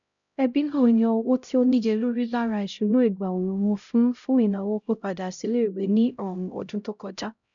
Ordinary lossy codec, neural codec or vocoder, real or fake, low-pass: none; codec, 16 kHz, 0.5 kbps, X-Codec, HuBERT features, trained on LibriSpeech; fake; 7.2 kHz